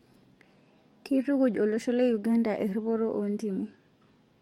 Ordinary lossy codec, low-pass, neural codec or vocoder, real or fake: MP3, 64 kbps; 19.8 kHz; codec, 44.1 kHz, 7.8 kbps, DAC; fake